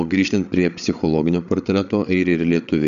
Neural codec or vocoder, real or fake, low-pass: codec, 16 kHz, 8 kbps, FreqCodec, larger model; fake; 7.2 kHz